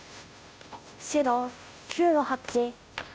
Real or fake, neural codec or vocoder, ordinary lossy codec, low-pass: fake; codec, 16 kHz, 0.5 kbps, FunCodec, trained on Chinese and English, 25 frames a second; none; none